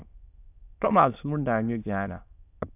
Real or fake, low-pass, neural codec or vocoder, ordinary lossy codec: fake; 3.6 kHz; autoencoder, 22.05 kHz, a latent of 192 numbers a frame, VITS, trained on many speakers; AAC, 32 kbps